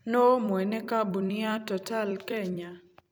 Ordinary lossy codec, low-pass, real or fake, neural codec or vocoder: none; none; fake; vocoder, 44.1 kHz, 128 mel bands every 256 samples, BigVGAN v2